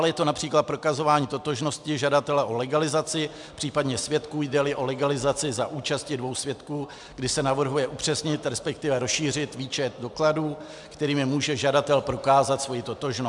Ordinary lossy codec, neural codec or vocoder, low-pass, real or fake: MP3, 96 kbps; none; 10.8 kHz; real